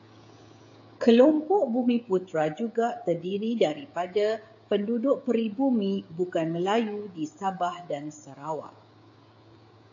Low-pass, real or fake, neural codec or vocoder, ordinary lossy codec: 7.2 kHz; fake; codec, 16 kHz, 16 kbps, FreqCodec, smaller model; AAC, 48 kbps